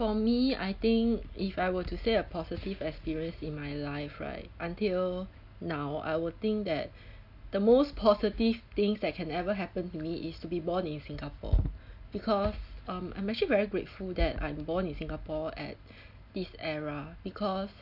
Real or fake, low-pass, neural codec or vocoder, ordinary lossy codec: real; 5.4 kHz; none; none